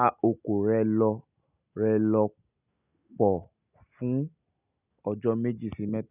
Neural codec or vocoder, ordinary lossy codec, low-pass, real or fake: none; none; 3.6 kHz; real